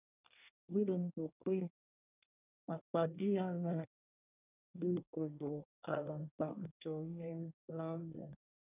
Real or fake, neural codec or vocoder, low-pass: fake; codec, 24 kHz, 1 kbps, SNAC; 3.6 kHz